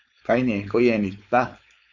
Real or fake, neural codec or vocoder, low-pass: fake; codec, 16 kHz, 4.8 kbps, FACodec; 7.2 kHz